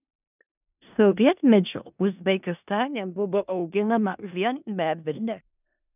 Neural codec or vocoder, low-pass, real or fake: codec, 16 kHz in and 24 kHz out, 0.4 kbps, LongCat-Audio-Codec, four codebook decoder; 3.6 kHz; fake